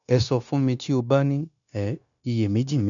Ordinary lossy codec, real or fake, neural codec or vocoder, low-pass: none; fake; codec, 16 kHz, 0.9 kbps, LongCat-Audio-Codec; 7.2 kHz